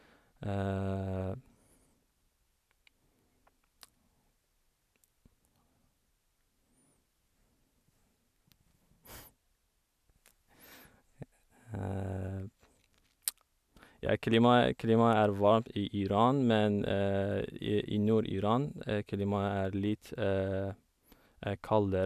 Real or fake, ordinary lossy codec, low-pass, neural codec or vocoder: real; none; 14.4 kHz; none